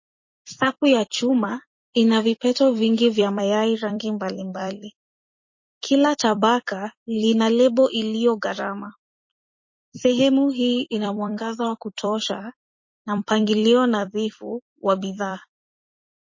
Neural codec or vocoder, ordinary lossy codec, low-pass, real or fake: vocoder, 44.1 kHz, 128 mel bands every 256 samples, BigVGAN v2; MP3, 32 kbps; 7.2 kHz; fake